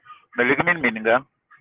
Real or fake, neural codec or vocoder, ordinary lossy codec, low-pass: real; none; Opus, 16 kbps; 3.6 kHz